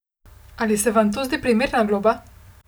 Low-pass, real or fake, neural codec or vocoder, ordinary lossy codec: none; real; none; none